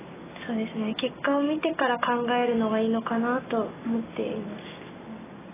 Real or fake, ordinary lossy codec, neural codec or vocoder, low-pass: real; AAC, 16 kbps; none; 3.6 kHz